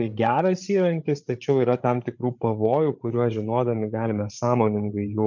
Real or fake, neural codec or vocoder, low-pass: fake; codec, 16 kHz, 8 kbps, FreqCodec, larger model; 7.2 kHz